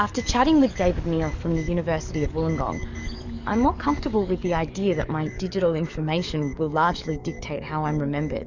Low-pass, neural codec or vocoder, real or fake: 7.2 kHz; codec, 44.1 kHz, 7.8 kbps, DAC; fake